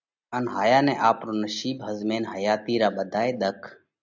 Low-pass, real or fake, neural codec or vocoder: 7.2 kHz; real; none